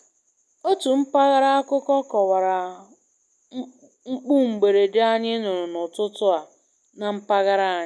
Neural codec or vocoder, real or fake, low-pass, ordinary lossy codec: none; real; none; none